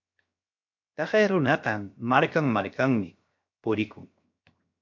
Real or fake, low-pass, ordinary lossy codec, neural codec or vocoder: fake; 7.2 kHz; MP3, 48 kbps; codec, 16 kHz, 0.7 kbps, FocalCodec